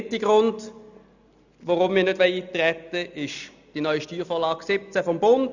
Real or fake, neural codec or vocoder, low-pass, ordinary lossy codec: real; none; 7.2 kHz; none